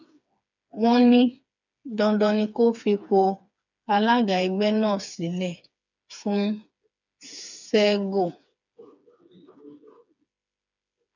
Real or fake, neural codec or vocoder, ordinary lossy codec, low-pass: fake; codec, 16 kHz, 4 kbps, FreqCodec, smaller model; none; 7.2 kHz